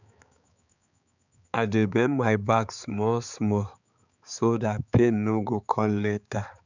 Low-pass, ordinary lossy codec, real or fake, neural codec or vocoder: 7.2 kHz; none; fake; codec, 16 kHz, 4 kbps, X-Codec, HuBERT features, trained on balanced general audio